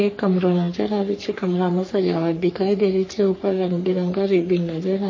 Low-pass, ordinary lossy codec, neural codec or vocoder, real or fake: 7.2 kHz; MP3, 32 kbps; codec, 44.1 kHz, 3.4 kbps, Pupu-Codec; fake